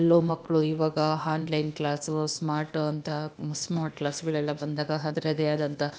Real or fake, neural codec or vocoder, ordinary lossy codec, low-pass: fake; codec, 16 kHz, 0.8 kbps, ZipCodec; none; none